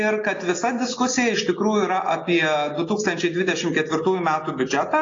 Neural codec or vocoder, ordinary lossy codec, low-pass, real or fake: none; AAC, 32 kbps; 7.2 kHz; real